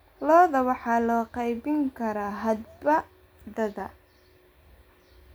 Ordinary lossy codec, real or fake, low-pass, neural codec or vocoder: none; real; none; none